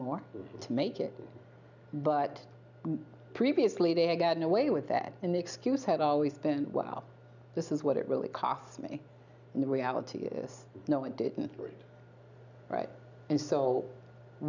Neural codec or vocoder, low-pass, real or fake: none; 7.2 kHz; real